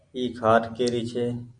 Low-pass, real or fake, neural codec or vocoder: 9.9 kHz; real; none